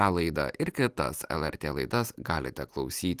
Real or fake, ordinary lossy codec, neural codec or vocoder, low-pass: real; Opus, 24 kbps; none; 14.4 kHz